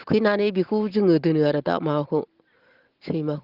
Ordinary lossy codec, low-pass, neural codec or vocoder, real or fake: Opus, 16 kbps; 5.4 kHz; none; real